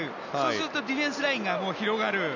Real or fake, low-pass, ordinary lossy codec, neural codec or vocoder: real; 7.2 kHz; none; none